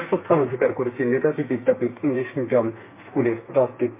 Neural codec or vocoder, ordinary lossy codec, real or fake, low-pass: codec, 32 kHz, 1.9 kbps, SNAC; MP3, 24 kbps; fake; 3.6 kHz